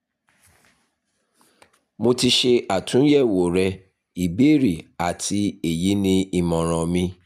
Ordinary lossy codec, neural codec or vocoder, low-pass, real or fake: none; none; 14.4 kHz; real